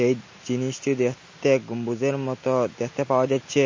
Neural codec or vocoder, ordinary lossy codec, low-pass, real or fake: none; MP3, 32 kbps; 7.2 kHz; real